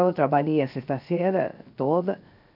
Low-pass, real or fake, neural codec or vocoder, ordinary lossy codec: 5.4 kHz; fake; codec, 16 kHz, 0.7 kbps, FocalCodec; none